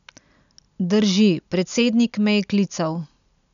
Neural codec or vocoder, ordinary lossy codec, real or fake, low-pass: none; none; real; 7.2 kHz